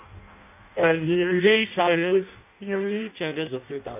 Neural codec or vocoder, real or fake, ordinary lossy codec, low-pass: codec, 16 kHz in and 24 kHz out, 0.6 kbps, FireRedTTS-2 codec; fake; none; 3.6 kHz